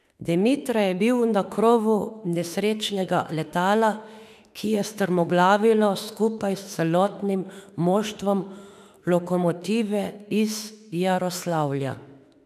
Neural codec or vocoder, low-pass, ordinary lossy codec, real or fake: autoencoder, 48 kHz, 32 numbers a frame, DAC-VAE, trained on Japanese speech; 14.4 kHz; none; fake